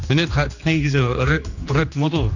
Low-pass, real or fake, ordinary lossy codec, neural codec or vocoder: 7.2 kHz; fake; none; codec, 16 kHz, 2 kbps, X-Codec, HuBERT features, trained on general audio